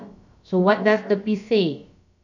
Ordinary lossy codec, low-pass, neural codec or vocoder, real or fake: none; 7.2 kHz; codec, 16 kHz, about 1 kbps, DyCAST, with the encoder's durations; fake